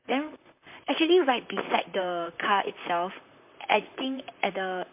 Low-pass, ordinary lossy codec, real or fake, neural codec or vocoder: 3.6 kHz; MP3, 32 kbps; fake; vocoder, 44.1 kHz, 128 mel bands, Pupu-Vocoder